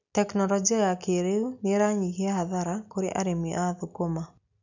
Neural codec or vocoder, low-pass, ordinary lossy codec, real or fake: none; 7.2 kHz; none; real